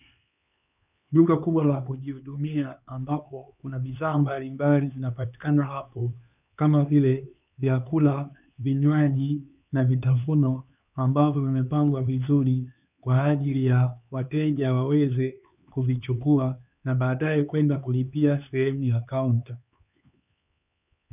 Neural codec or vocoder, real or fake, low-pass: codec, 16 kHz, 4 kbps, X-Codec, HuBERT features, trained on LibriSpeech; fake; 3.6 kHz